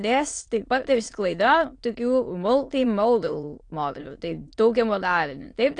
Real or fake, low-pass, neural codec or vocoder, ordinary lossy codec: fake; 9.9 kHz; autoencoder, 22.05 kHz, a latent of 192 numbers a frame, VITS, trained on many speakers; AAC, 48 kbps